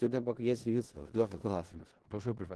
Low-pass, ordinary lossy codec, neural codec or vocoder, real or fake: 10.8 kHz; Opus, 16 kbps; codec, 16 kHz in and 24 kHz out, 0.4 kbps, LongCat-Audio-Codec, four codebook decoder; fake